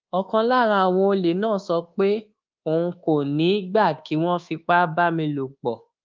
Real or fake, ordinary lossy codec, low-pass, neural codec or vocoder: fake; Opus, 24 kbps; 7.2 kHz; codec, 24 kHz, 1.2 kbps, DualCodec